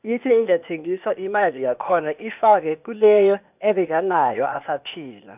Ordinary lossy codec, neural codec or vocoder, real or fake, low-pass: none; codec, 16 kHz, 0.8 kbps, ZipCodec; fake; 3.6 kHz